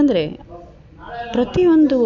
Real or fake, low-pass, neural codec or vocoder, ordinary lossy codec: real; 7.2 kHz; none; none